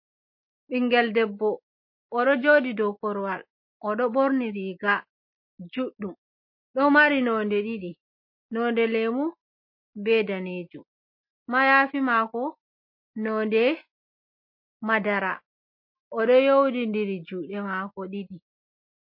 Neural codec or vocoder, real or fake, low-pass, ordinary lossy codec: none; real; 5.4 kHz; MP3, 32 kbps